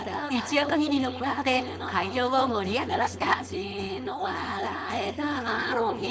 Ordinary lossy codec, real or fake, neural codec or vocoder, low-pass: none; fake; codec, 16 kHz, 4.8 kbps, FACodec; none